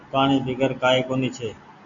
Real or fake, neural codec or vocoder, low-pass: real; none; 7.2 kHz